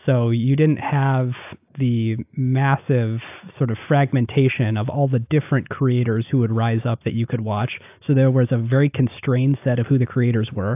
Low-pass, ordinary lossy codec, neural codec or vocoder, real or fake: 3.6 kHz; AAC, 32 kbps; none; real